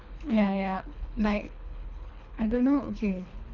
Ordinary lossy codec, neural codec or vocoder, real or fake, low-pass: none; codec, 24 kHz, 3 kbps, HILCodec; fake; 7.2 kHz